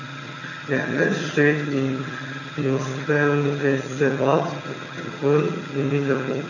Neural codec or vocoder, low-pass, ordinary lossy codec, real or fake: vocoder, 22.05 kHz, 80 mel bands, HiFi-GAN; 7.2 kHz; none; fake